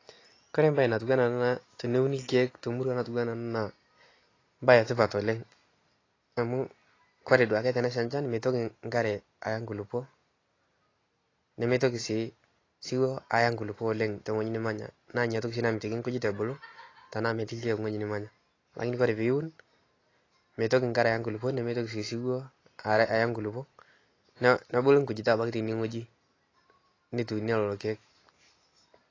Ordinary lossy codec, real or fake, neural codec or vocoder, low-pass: AAC, 32 kbps; real; none; 7.2 kHz